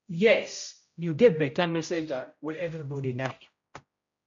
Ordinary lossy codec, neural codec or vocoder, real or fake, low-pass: MP3, 48 kbps; codec, 16 kHz, 0.5 kbps, X-Codec, HuBERT features, trained on general audio; fake; 7.2 kHz